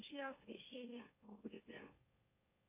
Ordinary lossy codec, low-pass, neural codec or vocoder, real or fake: MP3, 24 kbps; 3.6 kHz; autoencoder, 44.1 kHz, a latent of 192 numbers a frame, MeloTTS; fake